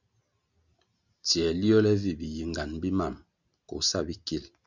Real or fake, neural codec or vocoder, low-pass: real; none; 7.2 kHz